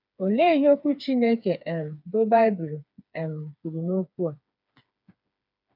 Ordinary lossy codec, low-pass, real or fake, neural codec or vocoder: none; 5.4 kHz; fake; codec, 16 kHz, 4 kbps, FreqCodec, smaller model